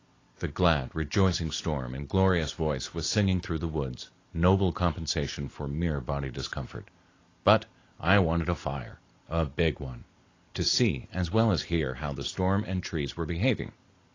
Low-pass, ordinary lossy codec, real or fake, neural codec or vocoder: 7.2 kHz; AAC, 32 kbps; real; none